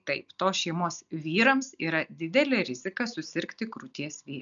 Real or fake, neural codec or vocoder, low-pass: real; none; 7.2 kHz